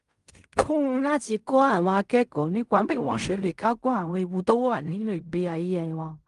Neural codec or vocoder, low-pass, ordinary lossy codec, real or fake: codec, 16 kHz in and 24 kHz out, 0.4 kbps, LongCat-Audio-Codec, fine tuned four codebook decoder; 10.8 kHz; Opus, 32 kbps; fake